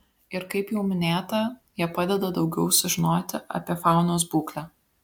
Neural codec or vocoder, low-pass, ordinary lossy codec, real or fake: none; 19.8 kHz; MP3, 96 kbps; real